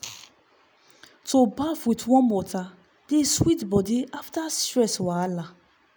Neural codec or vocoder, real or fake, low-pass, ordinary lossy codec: none; real; none; none